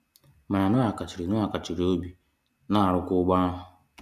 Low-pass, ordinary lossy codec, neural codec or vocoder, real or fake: 14.4 kHz; none; none; real